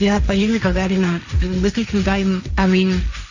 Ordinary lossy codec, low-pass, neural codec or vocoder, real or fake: none; 7.2 kHz; codec, 16 kHz, 1.1 kbps, Voila-Tokenizer; fake